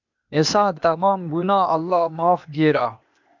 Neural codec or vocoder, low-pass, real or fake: codec, 16 kHz, 0.8 kbps, ZipCodec; 7.2 kHz; fake